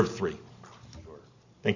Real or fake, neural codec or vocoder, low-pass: real; none; 7.2 kHz